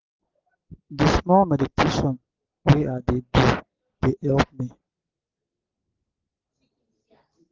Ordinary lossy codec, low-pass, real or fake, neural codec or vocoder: Opus, 16 kbps; 7.2 kHz; real; none